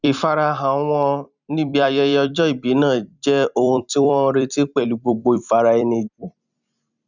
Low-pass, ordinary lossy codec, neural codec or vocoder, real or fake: 7.2 kHz; none; vocoder, 44.1 kHz, 128 mel bands every 256 samples, BigVGAN v2; fake